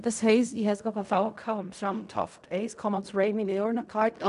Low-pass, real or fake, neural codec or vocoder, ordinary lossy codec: 10.8 kHz; fake; codec, 16 kHz in and 24 kHz out, 0.4 kbps, LongCat-Audio-Codec, fine tuned four codebook decoder; none